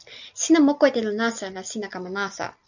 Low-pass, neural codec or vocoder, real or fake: 7.2 kHz; none; real